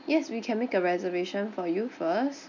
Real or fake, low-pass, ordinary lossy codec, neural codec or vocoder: real; 7.2 kHz; none; none